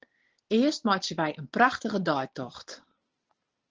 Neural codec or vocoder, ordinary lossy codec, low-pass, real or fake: none; Opus, 16 kbps; 7.2 kHz; real